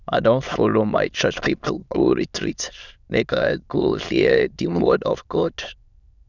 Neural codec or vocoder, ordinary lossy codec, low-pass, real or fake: autoencoder, 22.05 kHz, a latent of 192 numbers a frame, VITS, trained on many speakers; none; 7.2 kHz; fake